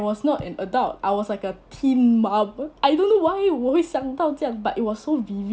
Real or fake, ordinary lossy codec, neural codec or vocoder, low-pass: real; none; none; none